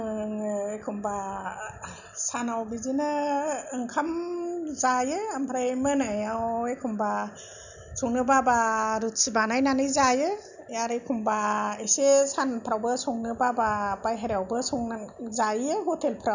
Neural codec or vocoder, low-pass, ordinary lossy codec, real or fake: none; 7.2 kHz; none; real